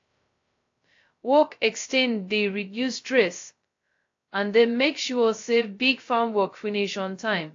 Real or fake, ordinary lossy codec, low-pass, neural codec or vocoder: fake; AAC, 48 kbps; 7.2 kHz; codec, 16 kHz, 0.2 kbps, FocalCodec